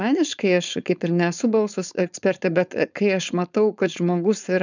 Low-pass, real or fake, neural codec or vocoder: 7.2 kHz; fake; codec, 16 kHz, 4.8 kbps, FACodec